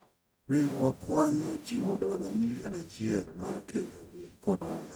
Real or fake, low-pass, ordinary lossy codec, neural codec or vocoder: fake; none; none; codec, 44.1 kHz, 0.9 kbps, DAC